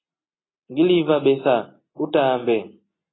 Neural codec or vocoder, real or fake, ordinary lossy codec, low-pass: none; real; AAC, 16 kbps; 7.2 kHz